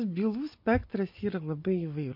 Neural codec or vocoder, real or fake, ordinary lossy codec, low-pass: none; real; MP3, 32 kbps; 5.4 kHz